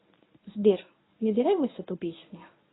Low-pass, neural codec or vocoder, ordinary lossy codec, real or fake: 7.2 kHz; codec, 24 kHz, 0.9 kbps, WavTokenizer, medium speech release version 1; AAC, 16 kbps; fake